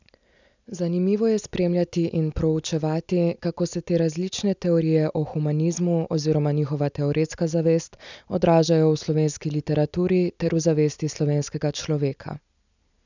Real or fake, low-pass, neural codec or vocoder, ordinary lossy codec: real; 7.2 kHz; none; none